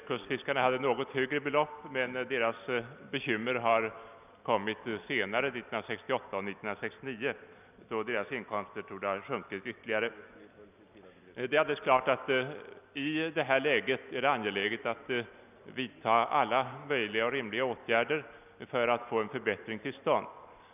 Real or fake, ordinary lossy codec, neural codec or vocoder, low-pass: real; none; none; 3.6 kHz